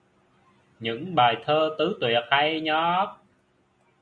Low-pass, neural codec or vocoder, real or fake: 9.9 kHz; none; real